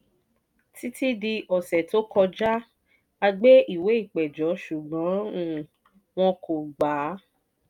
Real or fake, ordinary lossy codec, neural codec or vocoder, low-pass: real; none; none; 19.8 kHz